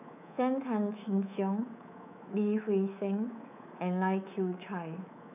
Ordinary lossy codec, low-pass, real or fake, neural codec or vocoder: none; 3.6 kHz; fake; codec, 24 kHz, 3.1 kbps, DualCodec